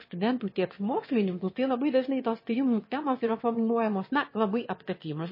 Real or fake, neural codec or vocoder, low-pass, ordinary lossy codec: fake; autoencoder, 22.05 kHz, a latent of 192 numbers a frame, VITS, trained on one speaker; 5.4 kHz; MP3, 24 kbps